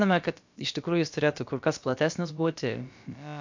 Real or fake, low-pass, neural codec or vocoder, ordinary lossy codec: fake; 7.2 kHz; codec, 16 kHz, about 1 kbps, DyCAST, with the encoder's durations; MP3, 64 kbps